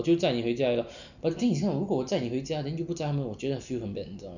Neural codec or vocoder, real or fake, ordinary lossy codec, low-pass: none; real; none; 7.2 kHz